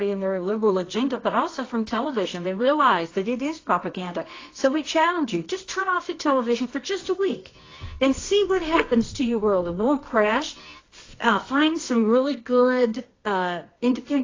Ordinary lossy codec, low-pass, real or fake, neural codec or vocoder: AAC, 32 kbps; 7.2 kHz; fake; codec, 24 kHz, 0.9 kbps, WavTokenizer, medium music audio release